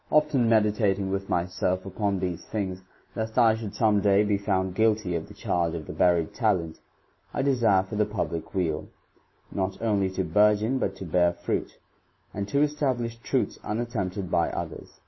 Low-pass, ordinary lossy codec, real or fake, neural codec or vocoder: 7.2 kHz; MP3, 24 kbps; real; none